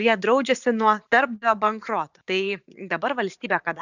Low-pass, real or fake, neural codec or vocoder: 7.2 kHz; fake; vocoder, 44.1 kHz, 80 mel bands, Vocos